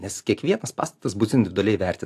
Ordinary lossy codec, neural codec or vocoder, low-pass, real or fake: AAC, 64 kbps; none; 14.4 kHz; real